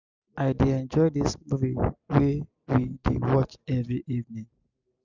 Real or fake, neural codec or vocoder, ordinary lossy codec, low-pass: real; none; none; 7.2 kHz